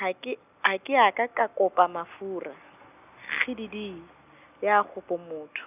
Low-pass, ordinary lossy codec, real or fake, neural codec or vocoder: 3.6 kHz; none; real; none